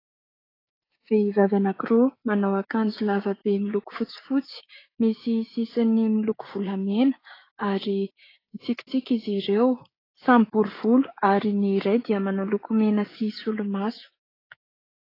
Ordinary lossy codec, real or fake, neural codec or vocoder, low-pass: AAC, 24 kbps; fake; codec, 16 kHz, 6 kbps, DAC; 5.4 kHz